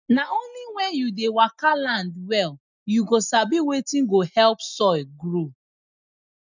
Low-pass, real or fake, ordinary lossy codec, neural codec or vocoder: 7.2 kHz; real; none; none